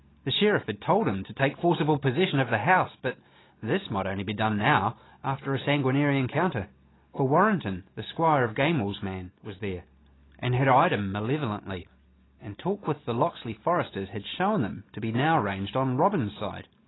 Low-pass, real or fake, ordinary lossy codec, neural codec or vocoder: 7.2 kHz; real; AAC, 16 kbps; none